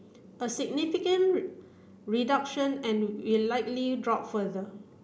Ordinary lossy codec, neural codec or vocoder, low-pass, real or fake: none; none; none; real